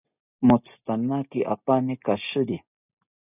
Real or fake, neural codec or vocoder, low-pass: real; none; 3.6 kHz